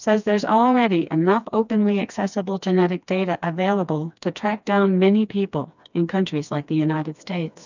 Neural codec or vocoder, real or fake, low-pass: codec, 16 kHz, 2 kbps, FreqCodec, smaller model; fake; 7.2 kHz